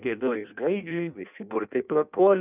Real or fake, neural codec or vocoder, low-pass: fake; codec, 16 kHz in and 24 kHz out, 0.6 kbps, FireRedTTS-2 codec; 3.6 kHz